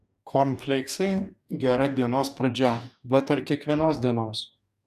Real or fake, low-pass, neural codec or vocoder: fake; 14.4 kHz; codec, 44.1 kHz, 2.6 kbps, DAC